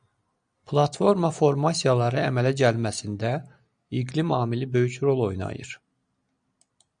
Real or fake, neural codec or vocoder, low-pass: real; none; 9.9 kHz